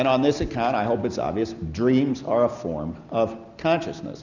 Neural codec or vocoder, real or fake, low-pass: none; real; 7.2 kHz